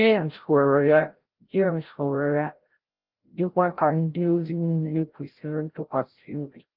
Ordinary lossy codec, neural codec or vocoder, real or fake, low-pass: Opus, 16 kbps; codec, 16 kHz, 0.5 kbps, FreqCodec, larger model; fake; 5.4 kHz